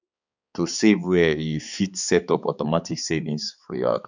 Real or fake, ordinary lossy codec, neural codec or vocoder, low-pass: fake; none; codec, 16 kHz, 4 kbps, X-Codec, HuBERT features, trained on balanced general audio; 7.2 kHz